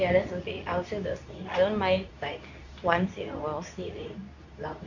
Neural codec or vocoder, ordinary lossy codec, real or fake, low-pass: codec, 24 kHz, 0.9 kbps, WavTokenizer, medium speech release version 2; none; fake; 7.2 kHz